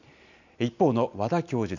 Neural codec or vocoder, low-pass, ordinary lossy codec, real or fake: none; 7.2 kHz; none; real